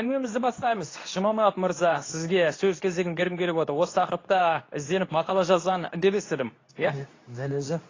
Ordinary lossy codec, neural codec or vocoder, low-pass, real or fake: AAC, 32 kbps; codec, 24 kHz, 0.9 kbps, WavTokenizer, medium speech release version 2; 7.2 kHz; fake